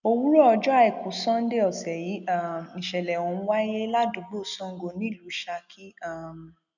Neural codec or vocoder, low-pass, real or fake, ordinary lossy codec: none; 7.2 kHz; real; none